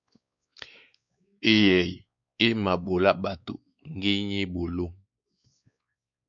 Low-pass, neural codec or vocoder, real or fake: 7.2 kHz; codec, 16 kHz, 4 kbps, X-Codec, WavLM features, trained on Multilingual LibriSpeech; fake